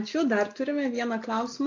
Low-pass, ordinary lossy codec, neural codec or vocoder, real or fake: 7.2 kHz; AAC, 48 kbps; none; real